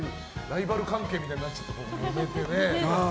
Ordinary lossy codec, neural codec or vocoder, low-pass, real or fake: none; none; none; real